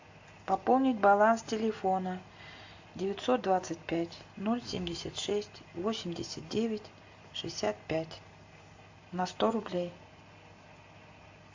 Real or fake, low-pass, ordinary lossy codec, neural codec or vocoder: real; 7.2 kHz; AAC, 48 kbps; none